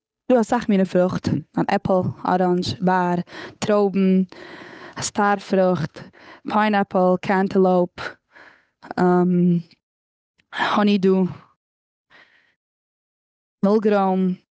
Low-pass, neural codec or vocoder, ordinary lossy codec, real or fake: none; codec, 16 kHz, 8 kbps, FunCodec, trained on Chinese and English, 25 frames a second; none; fake